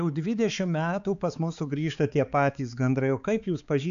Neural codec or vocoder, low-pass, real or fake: codec, 16 kHz, 4 kbps, X-Codec, HuBERT features, trained on balanced general audio; 7.2 kHz; fake